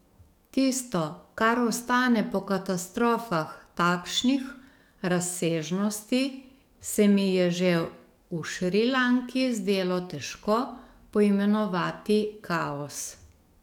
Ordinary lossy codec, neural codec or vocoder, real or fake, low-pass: none; codec, 44.1 kHz, 7.8 kbps, DAC; fake; 19.8 kHz